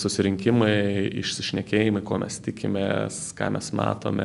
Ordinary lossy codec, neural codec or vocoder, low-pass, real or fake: MP3, 96 kbps; none; 10.8 kHz; real